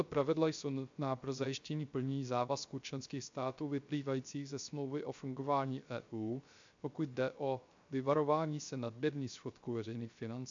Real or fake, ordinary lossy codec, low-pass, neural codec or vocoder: fake; MP3, 64 kbps; 7.2 kHz; codec, 16 kHz, 0.3 kbps, FocalCodec